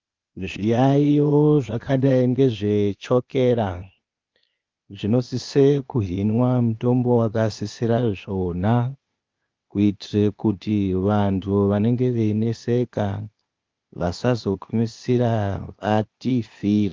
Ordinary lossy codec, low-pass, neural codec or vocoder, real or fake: Opus, 32 kbps; 7.2 kHz; codec, 16 kHz, 0.8 kbps, ZipCodec; fake